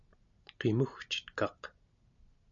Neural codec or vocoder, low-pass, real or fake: none; 7.2 kHz; real